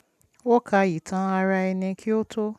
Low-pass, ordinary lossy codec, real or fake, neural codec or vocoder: 14.4 kHz; none; real; none